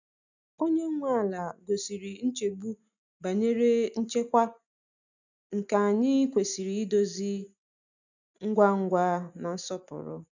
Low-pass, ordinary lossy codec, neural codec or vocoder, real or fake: 7.2 kHz; none; none; real